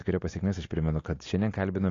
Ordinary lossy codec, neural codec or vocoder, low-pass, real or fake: AAC, 32 kbps; none; 7.2 kHz; real